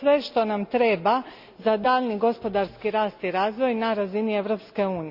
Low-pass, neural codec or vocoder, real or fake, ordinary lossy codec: 5.4 kHz; none; real; Opus, 64 kbps